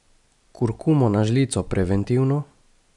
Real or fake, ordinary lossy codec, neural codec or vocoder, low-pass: real; none; none; 10.8 kHz